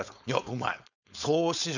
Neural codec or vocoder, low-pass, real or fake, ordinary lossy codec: codec, 16 kHz, 4.8 kbps, FACodec; 7.2 kHz; fake; none